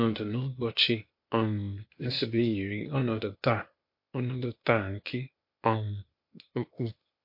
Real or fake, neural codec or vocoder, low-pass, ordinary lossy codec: fake; codec, 16 kHz, 0.8 kbps, ZipCodec; 5.4 kHz; MP3, 32 kbps